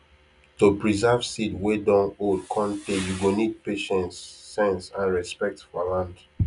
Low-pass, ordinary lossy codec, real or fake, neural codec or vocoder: 10.8 kHz; none; real; none